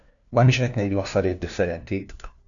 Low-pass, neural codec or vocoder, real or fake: 7.2 kHz; codec, 16 kHz, 1 kbps, FunCodec, trained on LibriTTS, 50 frames a second; fake